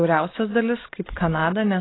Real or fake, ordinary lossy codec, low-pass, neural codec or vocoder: real; AAC, 16 kbps; 7.2 kHz; none